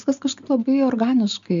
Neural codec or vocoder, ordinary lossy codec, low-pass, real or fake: none; MP3, 64 kbps; 7.2 kHz; real